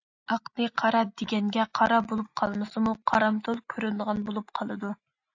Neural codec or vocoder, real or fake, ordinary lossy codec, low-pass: none; real; AAC, 48 kbps; 7.2 kHz